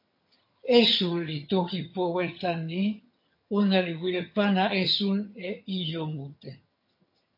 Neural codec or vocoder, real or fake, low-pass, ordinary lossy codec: vocoder, 22.05 kHz, 80 mel bands, HiFi-GAN; fake; 5.4 kHz; MP3, 32 kbps